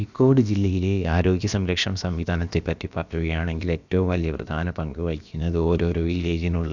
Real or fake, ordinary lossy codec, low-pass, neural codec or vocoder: fake; none; 7.2 kHz; codec, 16 kHz, about 1 kbps, DyCAST, with the encoder's durations